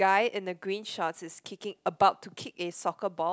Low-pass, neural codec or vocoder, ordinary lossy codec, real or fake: none; none; none; real